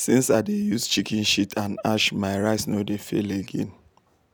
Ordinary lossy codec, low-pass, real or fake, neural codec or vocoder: none; none; real; none